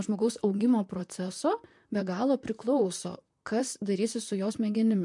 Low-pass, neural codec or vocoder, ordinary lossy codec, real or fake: 10.8 kHz; vocoder, 44.1 kHz, 128 mel bands, Pupu-Vocoder; MP3, 64 kbps; fake